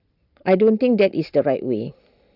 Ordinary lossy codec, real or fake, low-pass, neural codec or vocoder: none; real; 5.4 kHz; none